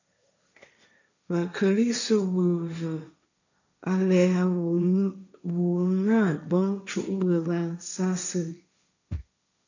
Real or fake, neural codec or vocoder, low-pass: fake; codec, 16 kHz, 1.1 kbps, Voila-Tokenizer; 7.2 kHz